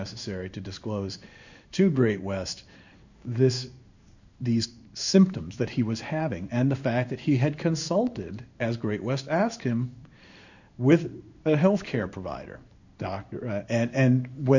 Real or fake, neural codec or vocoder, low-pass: fake; codec, 16 kHz in and 24 kHz out, 1 kbps, XY-Tokenizer; 7.2 kHz